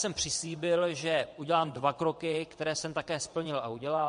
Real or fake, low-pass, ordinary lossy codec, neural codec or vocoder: fake; 9.9 kHz; MP3, 48 kbps; vocoder, 22.05 kHz, 80 mel bands, WaveNeXt